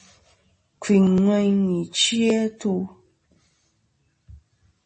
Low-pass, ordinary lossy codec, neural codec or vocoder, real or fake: 10.8 kHz; MP3, 32 kbps; none; real